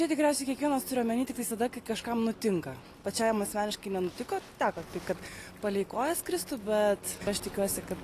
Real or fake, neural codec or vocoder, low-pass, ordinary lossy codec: real; none; 14.4 kHz; AAC, 48 kbps